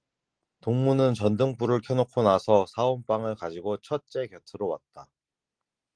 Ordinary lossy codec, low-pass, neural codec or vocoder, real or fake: Opus, 24 kbps; 9.9 kHz; none; real